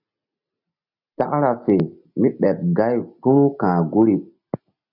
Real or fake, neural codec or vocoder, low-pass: real; none; 5.4 kHz